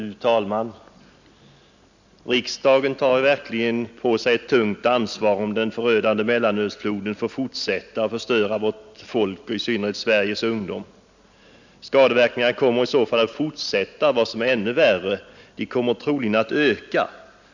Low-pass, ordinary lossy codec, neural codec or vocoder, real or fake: 7.2 kHz; none; none; real